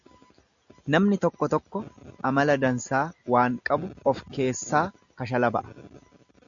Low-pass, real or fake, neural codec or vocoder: 7.2 kHz; real; none